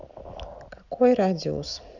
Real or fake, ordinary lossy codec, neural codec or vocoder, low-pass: real; Opus, 64 kbps; none; 7.2 kHz